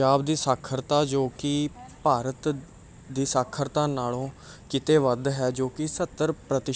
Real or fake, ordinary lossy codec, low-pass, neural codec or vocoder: real; none; none; none